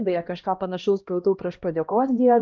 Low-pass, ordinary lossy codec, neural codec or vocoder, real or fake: 7.2 kHz; Opus, 24 kbps; codec, 16 kHz, 1 kbps, X-Codec, HuBERT features, trained on LibriSpeech; fake